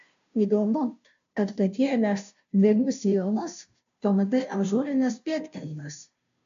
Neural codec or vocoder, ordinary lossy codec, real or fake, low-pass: codec, 16 kHz, 0.5 kbps, FunCodec, trained on Chinese and English, 25 frames a second; AAC, 64 kbps; fake; 7.2 kHz